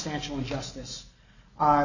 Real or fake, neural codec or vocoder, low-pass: real; none; 7.2 kHz